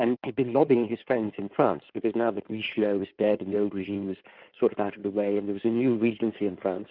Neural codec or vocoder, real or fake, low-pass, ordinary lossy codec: codec, 16 kHz in and 24 kHz out, 2.2 kbps, FireRedTTS-2 codec; fake; 5.4 kHz; Opus, 24 kbps